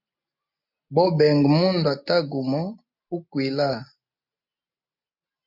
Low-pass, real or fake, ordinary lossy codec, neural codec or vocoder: 5.4 kHz; real; MP3, 48 kbps; none